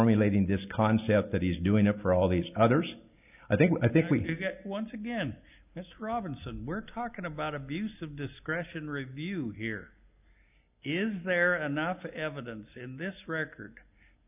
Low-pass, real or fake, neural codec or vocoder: 3.6 kHz; real; none